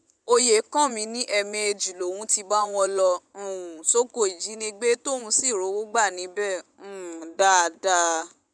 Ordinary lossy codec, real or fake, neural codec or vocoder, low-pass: none; fake; vocoder, 24 kHz, 100 mel bands, Vocos; 9.9 kHz